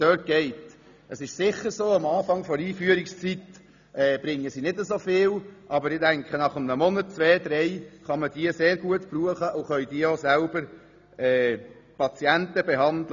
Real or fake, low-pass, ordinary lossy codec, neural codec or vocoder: real; 7.2 kHz; none; none